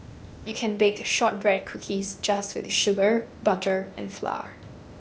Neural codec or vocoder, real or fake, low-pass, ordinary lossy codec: codec, 16 kHz, 0.8 kbps, ZipCodec; fake; none; none